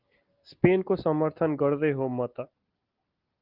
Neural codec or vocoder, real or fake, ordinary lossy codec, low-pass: none; real; Opus, 32 kbps; 5.4 kHz